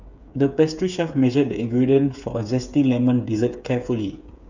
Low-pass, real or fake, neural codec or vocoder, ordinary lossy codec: 7.2 kHz; fake; codec, 16 kHz, 8 kbps, FreqCodec, smaller model; none